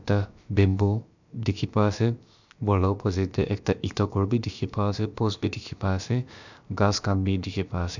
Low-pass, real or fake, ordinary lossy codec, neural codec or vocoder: 7.2 kHz; fake; none; codec, 16 kHz, about 1 kbps, DyCAST, with the encoder's durations